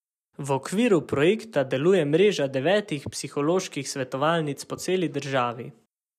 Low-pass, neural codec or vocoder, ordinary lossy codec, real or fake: 14.4 kHz; none; none; real